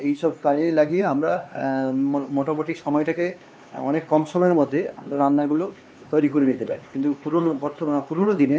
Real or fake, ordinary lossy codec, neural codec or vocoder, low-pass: fake; none; codec, 16 kHz, 2 kbps, X-Codec, HuBERT features, trained on LibriSpeech; none